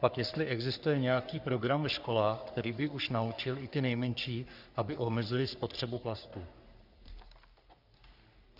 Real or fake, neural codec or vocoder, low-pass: fake; codec, 44.1 kHz, 3.4 kbps, Pupu-Codec; 5.4 kHz